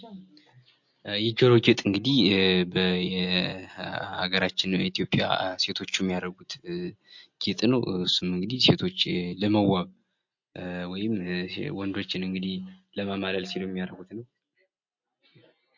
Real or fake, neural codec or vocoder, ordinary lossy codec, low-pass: real; none; MP3, 48 kbps; 7.2 kHz